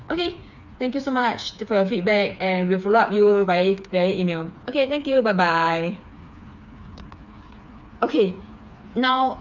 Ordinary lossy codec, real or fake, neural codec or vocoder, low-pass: none; fake; codec, 16 kHz, 4 kbps, FreqCodec, smaller model; 7.2 kHz